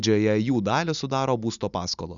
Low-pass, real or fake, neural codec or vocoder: 7.2 kHz; real; none